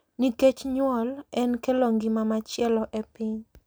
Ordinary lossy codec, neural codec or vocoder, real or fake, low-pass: none; none; real; none